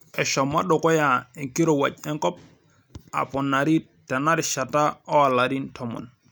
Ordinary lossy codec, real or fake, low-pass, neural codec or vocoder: none; real; none; none